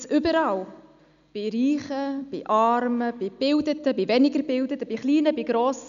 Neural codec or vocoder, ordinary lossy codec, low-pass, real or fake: none; none; 7.2 kHz; real